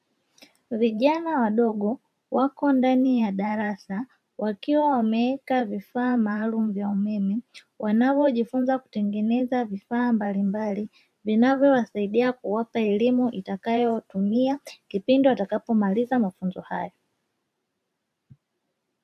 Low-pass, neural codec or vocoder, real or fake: 14.4 kHz; vocoder, 44.1 kHz, 128 mel bands every 512 samples, BigVGAN v2; fake